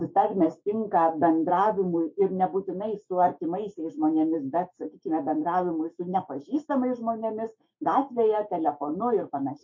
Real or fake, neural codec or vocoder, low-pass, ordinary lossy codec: fake; autoencoder, 48 kHz, 128 numbers a frame, DAC-VAE, trained on Japanese speech; 7.2 kHz; MP3, 32 kbps